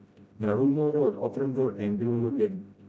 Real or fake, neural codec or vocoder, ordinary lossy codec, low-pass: fake; codec, 16 kHz, 0.5 kbps, FreqCodec, smaller model; none; none